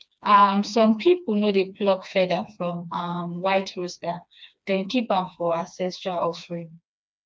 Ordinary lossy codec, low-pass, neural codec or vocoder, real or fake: none; none; codec, 16 kHz, 2 kbps, FreqCodec, smaller model; fake